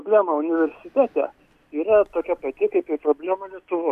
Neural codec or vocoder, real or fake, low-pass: none; real; 14.4 kHz